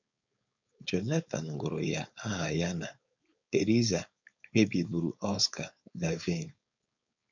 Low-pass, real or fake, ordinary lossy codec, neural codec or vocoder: 7.2 kHz; fake; none; codec, 16 kHz, 4.8 kbps, FACodec